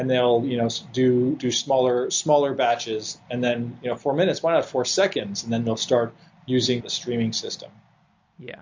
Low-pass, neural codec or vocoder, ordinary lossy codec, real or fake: 7.2 kHz; none; MP3, 48 kbps; real